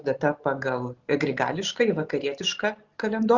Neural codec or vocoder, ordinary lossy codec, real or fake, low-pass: none; Opus, 64 kbps; real; 7.2 kHz